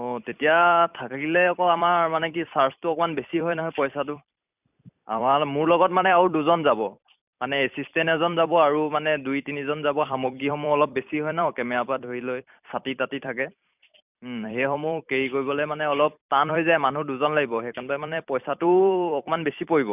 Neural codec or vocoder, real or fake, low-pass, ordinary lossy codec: vocoder, 44.1 kHz, 128 mel bands every 256 samples, BigVGAN v2; fake; 3.6 kHz; none